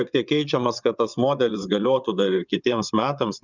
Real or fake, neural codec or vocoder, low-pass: fake; vocoder, 22.05 kHz, 80 mel bands, WaveNeXt; 7.2 kHz